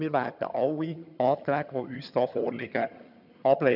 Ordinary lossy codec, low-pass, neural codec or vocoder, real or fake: none; 5.4 kHz; vocoder, 22.05 kHz, 80 mel bands, HiFi-GAN; fake